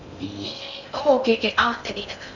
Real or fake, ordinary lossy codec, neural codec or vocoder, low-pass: fake; none; codec, 16 kHz in and 24 kHz out, 0.6 kbps, FocalCodec, streaming, 4096 codes; 7.2 kHz